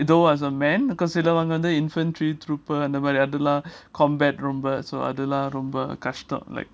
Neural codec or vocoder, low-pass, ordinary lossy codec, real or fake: none; none; none; real